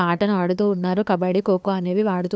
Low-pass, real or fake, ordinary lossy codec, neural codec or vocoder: none; fake; none; codec, 16 kHz, 2 kbps, FunCodec, trained on LibriTTS, 25 frames a second